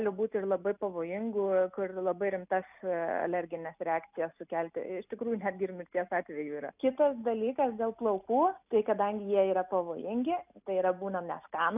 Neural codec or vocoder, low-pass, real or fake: none; 3.6 kHz; real